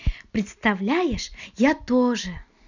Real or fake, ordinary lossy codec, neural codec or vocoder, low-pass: real; none; none; 7.2 kHz